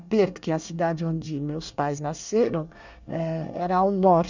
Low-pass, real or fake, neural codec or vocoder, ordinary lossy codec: 7.2 kHz; fake; codec, 24 kHz, 1 kbps, SNAC; none